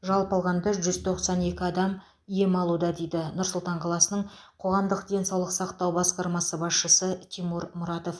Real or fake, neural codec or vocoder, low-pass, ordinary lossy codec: real; none; none; none